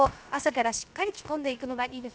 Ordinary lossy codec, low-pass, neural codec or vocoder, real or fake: none; none; codec, 16 kHz, 0.7 kbps, FocalCodec; fake